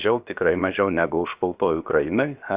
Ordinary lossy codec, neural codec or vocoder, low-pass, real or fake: Opus, 64 kbps; codec, 16 kHz, 0.7 kbps, FocalCodec; 3.6 kHz; fake